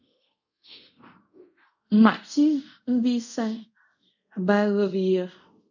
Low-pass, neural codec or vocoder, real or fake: 7.2 kHz; codec, 24 kHz, 0.5 kbps, DualCodec; fake